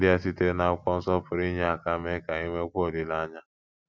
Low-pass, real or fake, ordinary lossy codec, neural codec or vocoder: none; real; none; none